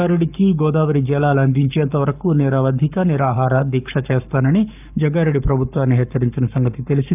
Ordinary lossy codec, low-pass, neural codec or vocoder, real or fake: none; 3.6 kHz; codec, 44.1 kHz, 7.8 kbps, Pupu-Codec; fake